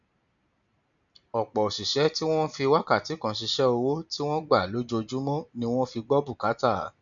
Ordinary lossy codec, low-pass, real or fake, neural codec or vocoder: none; 7.2 kHz; real; none